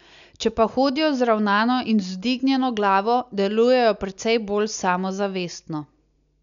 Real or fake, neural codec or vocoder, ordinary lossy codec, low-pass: real; none; none; 7.2 kHz